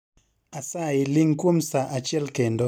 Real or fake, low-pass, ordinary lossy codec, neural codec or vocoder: real; 19.8 kHz; none; none